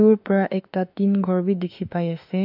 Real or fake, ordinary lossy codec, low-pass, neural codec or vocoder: fake; AAC, 48 kbps; 5.4 kHz; autoencoder, 48 kHz, 32 numbers a frame, DAC-VAE, trained on Japanese speech